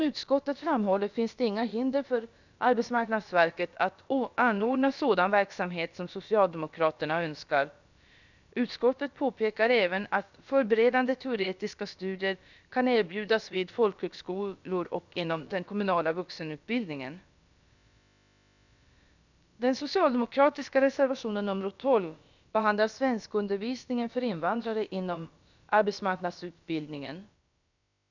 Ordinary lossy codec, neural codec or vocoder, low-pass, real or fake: none; codec, 16 kHz, about 1 kbps, DyCAST, with the encoder's durations; 7.2 kHz; fake